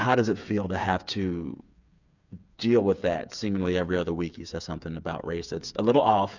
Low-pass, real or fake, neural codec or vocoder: 7.2 kHz; fake; codec, 16 kHz, 8 kbps, FreqCodec, smaller model